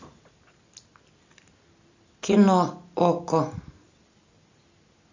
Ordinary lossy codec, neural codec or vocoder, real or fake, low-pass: AAC, 48 kbps; none; real; 7.2 kHz